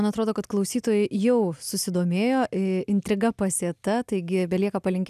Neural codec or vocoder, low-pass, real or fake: none; 14.4 kHz; real